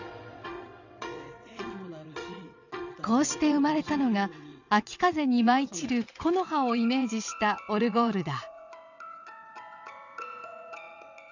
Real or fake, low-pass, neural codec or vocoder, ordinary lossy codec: fake; 7.2 kHz; vocoder, 22.05 kHz, 80 mel bands, WaveNeXt; none